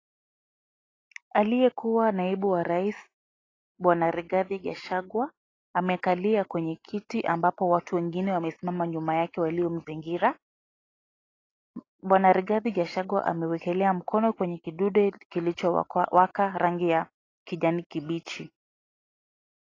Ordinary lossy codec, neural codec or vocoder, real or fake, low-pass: AAC, 32 kbps; none; real; 7.2 kHz